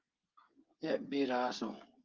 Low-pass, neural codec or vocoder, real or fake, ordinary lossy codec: 7.2 kHz; codec, 16 kHz, 16 kbps, FreqCodec, smaller model; fake; Opus, 32 kbps